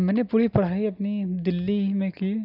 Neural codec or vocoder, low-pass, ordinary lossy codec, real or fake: none; 5.4 kHz; none; real